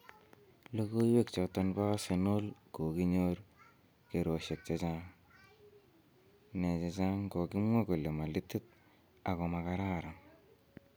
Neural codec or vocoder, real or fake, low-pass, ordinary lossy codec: none; real; none; none